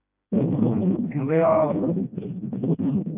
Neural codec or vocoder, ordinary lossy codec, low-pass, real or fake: codec, 16 kHz, 1 kbps, FreqCodec, smaller model; none; 3.6 kHz; fake